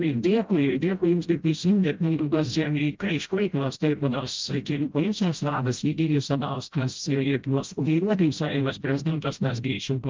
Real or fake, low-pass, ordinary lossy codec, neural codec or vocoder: fake; 7.2 kHz; Opus, 32 kbps; codec, 16 kHz, 0.5 kbps, FreqCodec, smaller model